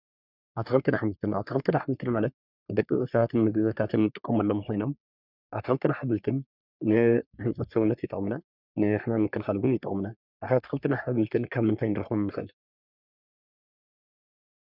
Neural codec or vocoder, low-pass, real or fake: codec, 44.1 kHz, 3.4 kbps, Pupu-Codec; 5.4 kHz; fake